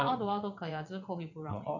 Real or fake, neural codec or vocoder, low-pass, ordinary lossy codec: fake; vocoder, 22.05 kHz, 80 mel bands, WaveNeXt; 7.2 kHz; none